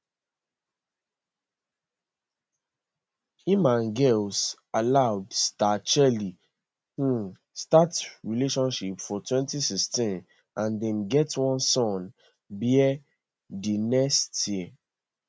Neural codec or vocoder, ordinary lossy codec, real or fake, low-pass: none; none; real; none